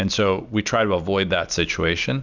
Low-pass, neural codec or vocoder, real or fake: 7.2 kHz; none; real